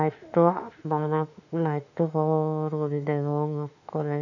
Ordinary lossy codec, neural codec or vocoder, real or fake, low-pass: none; autoencoder, 48 kHz, 32 numbers a frame, DAC-VAE, trained on Japanese speech; fake; 7.2 kHz